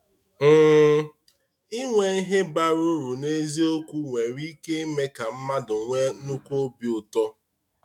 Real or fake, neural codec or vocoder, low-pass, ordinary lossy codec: fake; autoencoder, 48 kHz, 128 numbers a frame, DAC-VAE, trained on Japanese speech; 19.8 kHz; MP3, 96 kbps